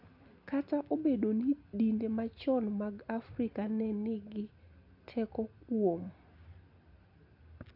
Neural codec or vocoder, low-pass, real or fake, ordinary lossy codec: none; 5.4 kHz; real; none